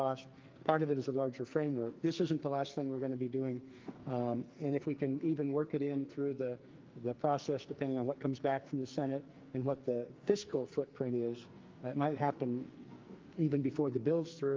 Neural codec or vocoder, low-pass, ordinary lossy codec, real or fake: codec, 44.1 kHz, 2.6 kbps, SNAC; 7.2 kHz; Opus, 24 kbps; fake